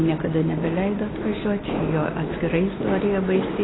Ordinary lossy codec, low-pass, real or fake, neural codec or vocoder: AAC, 16 kbps; 7.2 kHz; real; none